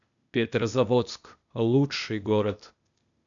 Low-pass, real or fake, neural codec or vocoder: 7.2 kHz; fake; codec, 16 kHz, 0.8 kbps, ZipCodec